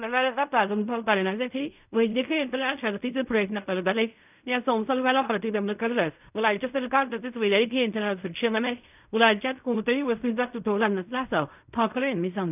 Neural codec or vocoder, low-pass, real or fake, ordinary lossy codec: codec, 16 kHz in and 24 kHz out, 0.4 kbps, LongCat-Audio-Codec, fine tuned four codebook decoder; 3.6 kHz; fake; none